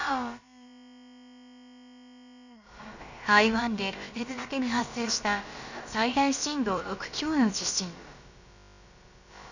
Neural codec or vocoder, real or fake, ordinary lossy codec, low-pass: codec, 16 kHz, about 1 kbps, DyCAST, with the encoder's durations; fake; none; 7.2 kHz